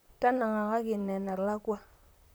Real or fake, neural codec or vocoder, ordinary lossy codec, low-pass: fake; vocoder, 44.1 kHz, 128 mel bands, Pupu-Vocoder; none; none